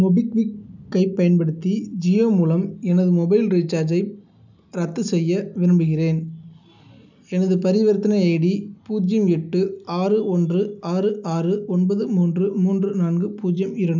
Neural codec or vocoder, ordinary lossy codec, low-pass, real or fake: none; none; 7.2 kHz; real